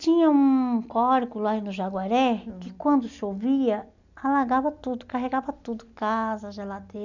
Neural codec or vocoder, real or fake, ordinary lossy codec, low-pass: none; real; none; 7.2 kHz